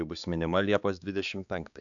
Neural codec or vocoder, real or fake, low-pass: codec, 16 kHz, 4 kbps, X-Codec, HuBERT features, trained on balanced general audio; fake; 7.2 kHz